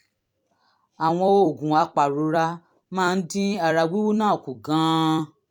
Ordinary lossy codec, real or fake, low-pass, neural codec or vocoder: none; fake; 19.8 kHz; vocoder, 44.1 kHz, 128 mel bands every 256 samples, BigVGAN v2